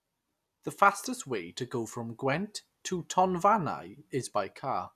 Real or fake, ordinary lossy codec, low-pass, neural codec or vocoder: real; none; 14.4 kHz; none